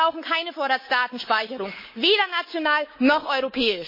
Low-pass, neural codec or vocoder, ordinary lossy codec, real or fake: 5.4 kHz; codec, 24 kHz, 3.1 kbps, DualCodec; MP3, 24 kbps; fake